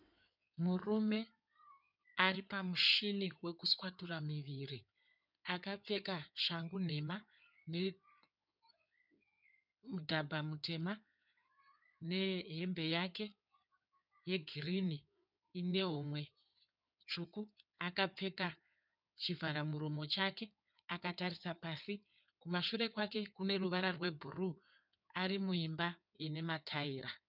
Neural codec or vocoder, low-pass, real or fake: codec, 16 kHz in and 24 kHz out, 2.2 kbps, FireRedTTS-2 codec; 5.4 kHz; fake